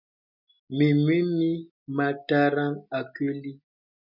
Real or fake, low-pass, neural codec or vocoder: real; 5.4 kHz; none